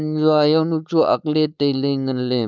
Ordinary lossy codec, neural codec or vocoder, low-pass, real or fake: none; codec, 16 kHz, 4.8 kbps, FACodec; none; fake